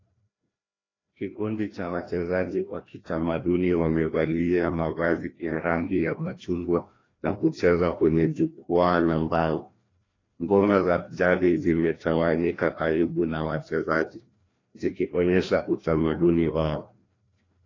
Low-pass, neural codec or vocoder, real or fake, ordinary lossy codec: 7.2 kHz; codec, 16 kHz, 1 kbps, FreqCodec, larger model; fake; AAC, 32 kbps